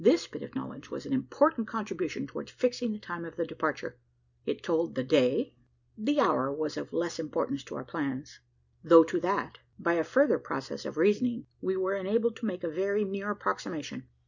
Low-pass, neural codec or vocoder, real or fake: 7.2 kHz; none; real